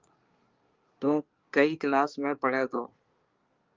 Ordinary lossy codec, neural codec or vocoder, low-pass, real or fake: Opus, 24 kbps; codec, 24 kHz, 1 kbps, SNAC; 7.2 kHz; fake